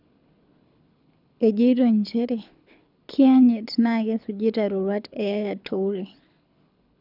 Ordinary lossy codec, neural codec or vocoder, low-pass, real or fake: none; codec, 24 kHz, 6 kbps, HILCodec; 5.4 kHz; fake